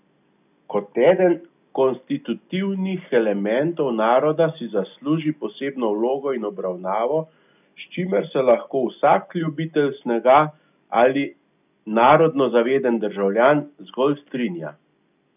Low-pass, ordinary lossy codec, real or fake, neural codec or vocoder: 3.6 kHz; none; real; none